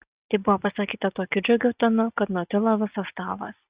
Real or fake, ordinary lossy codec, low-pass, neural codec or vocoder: real; Opus, 64 kbps; 3.6 kHz; none